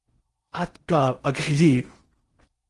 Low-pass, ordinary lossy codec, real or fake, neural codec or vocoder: 10.8 kHz; Opus, 24 kbps; fake; codec, 16 kHz in and 24 kHz out, 0.6 kbps, FocalCodec, streaming, 4096 codes